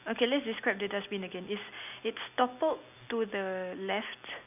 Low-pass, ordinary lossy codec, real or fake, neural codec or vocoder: 3.6 kHz; none; real; none